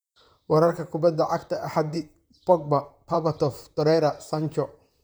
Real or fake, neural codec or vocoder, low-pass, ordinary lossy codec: fake; vocoder, 44.1 kHz, 128 mel bands, Pupu-Vocoder; none; none